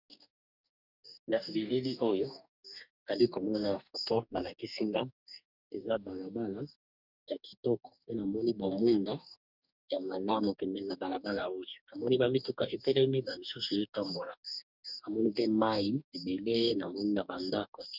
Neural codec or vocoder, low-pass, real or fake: codec, 44.1 kHz, 2.6 kbps, DAC; 5.4 kHz; fake